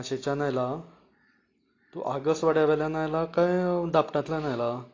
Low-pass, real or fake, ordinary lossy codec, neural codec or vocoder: 7.2 kHz; real; AAC, 32 kbps; none